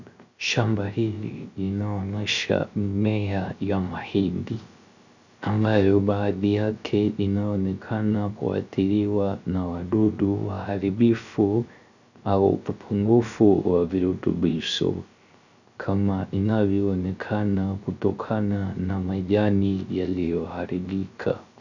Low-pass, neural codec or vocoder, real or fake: 7.2 kHz; codec, 16 kHz, 0.3 kbps, FocalCodec; fake